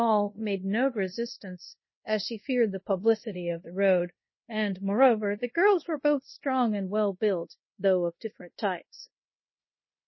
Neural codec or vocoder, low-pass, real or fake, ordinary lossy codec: codec, 24 kHz, 0.5 kbps, DualCodec; 7.2 kHz; fake; MP3, 24 kbps